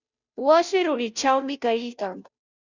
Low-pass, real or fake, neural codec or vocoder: 7.2 kHz; fake; codec, 16 kHz, 0.5 kbps, FunCodec, trained on Chinese and English, 25 frames a second